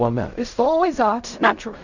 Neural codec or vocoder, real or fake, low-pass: codec, 16 kHz in and 24 kHz out, 0.4 kbps, LongCat-Audio-Codec, fine tuned four codebook decoder; fake; 7.2 kHz